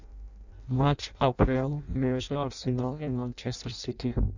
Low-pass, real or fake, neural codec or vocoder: 7.2 kHz; fake; codec, 16 kHz in and 24 kHz out, 0.6 kbps, FireRedTTS-2 codec